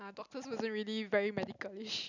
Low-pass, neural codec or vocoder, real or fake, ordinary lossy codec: 7.2 kHz; none; real; none